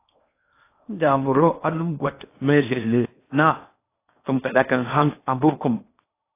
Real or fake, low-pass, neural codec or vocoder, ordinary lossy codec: fake; 3.6 kHz; codec, 16 kHz in and 24 kHz out, 0.8 kbps, FocalCodec, streaming, 65536 codes; AAC, 24 kbps